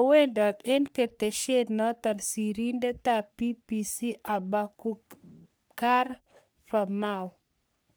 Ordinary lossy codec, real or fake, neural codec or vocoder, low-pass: none; fake; codec, 44.1 kHz, 3.4 kbps, Pupu-Codec; none